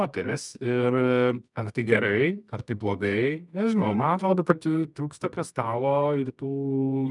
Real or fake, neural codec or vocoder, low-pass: fake; codec, 24 kHz, 0.9 kbps, WavTokenizer, medium music audio release; 10.8 kHz